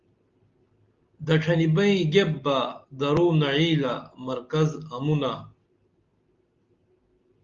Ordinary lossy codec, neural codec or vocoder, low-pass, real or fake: Opus, 32 kbps; none; 7.2 kHz; real